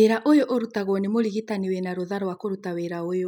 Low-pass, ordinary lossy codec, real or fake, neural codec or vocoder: 19.8 kHz; none; real; none